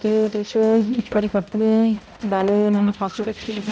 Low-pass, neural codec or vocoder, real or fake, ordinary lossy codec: none; codec, 16 kHz, 0.5 kbps, X-Codec, HuBERT features, trained on balanced general audio; fake; none